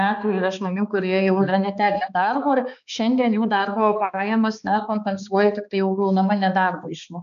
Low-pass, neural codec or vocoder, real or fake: 7.2 kHz; codec, 16 kHz, 2 kbps, X-Codec, HuBERT features, trained on balanced general audio; fake